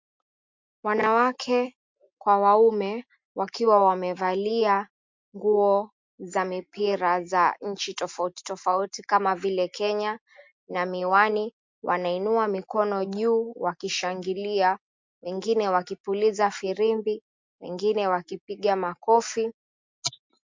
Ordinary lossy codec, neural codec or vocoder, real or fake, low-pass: MP3, 48 kbps; none; real; 7.2 kHz